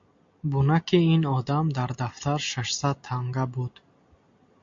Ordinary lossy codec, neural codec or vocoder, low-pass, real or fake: MP3, 48 kbps; none; 7.2 kHz; real